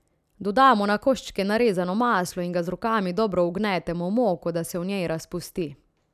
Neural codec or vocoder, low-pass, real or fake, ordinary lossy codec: none; 14.4 kHz; real; none